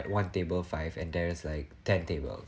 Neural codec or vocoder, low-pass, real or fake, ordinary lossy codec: none; none; real; none